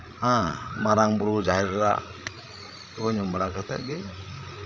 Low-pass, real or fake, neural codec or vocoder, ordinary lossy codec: none; fake; codec, 16 kHz, 16 kbps, FreqCodec, larger model; none